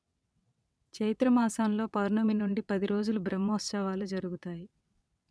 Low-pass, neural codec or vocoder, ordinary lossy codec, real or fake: none; vocoder, 22.05 kHz, 80 mel bands, WaveNeXt; none; fake